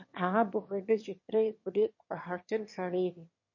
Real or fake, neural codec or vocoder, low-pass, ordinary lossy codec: fake; autoencoder, 22.05 kHz, a latent of 192 numbers a frame, VITS, trained on one speaker; 7.2 kHz; MP3, 32 kbps